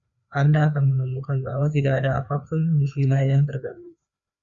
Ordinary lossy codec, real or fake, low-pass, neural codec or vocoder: Opus, 64 kbps; fake; 7.2 kHz; codec, 16 kHz, 2 kbps, FreqCodec, larger model